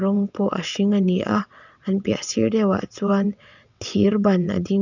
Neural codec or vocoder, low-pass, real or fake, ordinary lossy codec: vocoder, 22.05 kHz, 80 mel bands, WaveNeXt; 7.2 kHz; fake; none